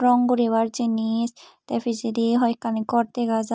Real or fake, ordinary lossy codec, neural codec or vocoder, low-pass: real; none; none; none